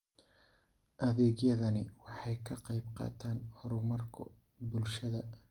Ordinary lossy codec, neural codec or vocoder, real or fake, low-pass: Opus, 32 kbps; none; real; 19.8 kHz